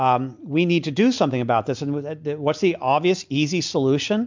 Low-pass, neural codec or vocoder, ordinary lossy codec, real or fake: 7.2 kHz; none; MP3, 64 kbps; real